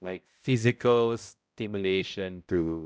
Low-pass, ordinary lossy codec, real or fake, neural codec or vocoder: none; none; fake; codec, 16 kHz, 0.5 kbps, X-Codec, HuBERT features, trained on balanced general audio